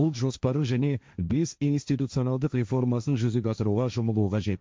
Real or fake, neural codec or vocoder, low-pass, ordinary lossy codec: fake; codec, 16 kHz, 1.1 kbps, Voila-Tokenizer; none; none